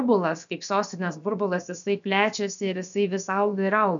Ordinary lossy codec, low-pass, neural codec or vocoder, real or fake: MP3, 96 kbps; 7.2 kHz; codec, 16 kHz, about 1 kbps, DyCAST, with the encoder's durations; fake